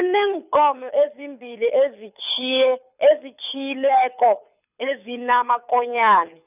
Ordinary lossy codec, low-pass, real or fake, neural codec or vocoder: none; 3.6 kHz; fake; codec, 24 kHz, 6 kbps, HILCodec